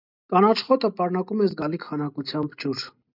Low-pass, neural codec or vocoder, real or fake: 5.4 kHz; none; real